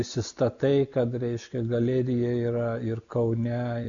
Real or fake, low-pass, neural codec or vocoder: real; 7.2 kHz; none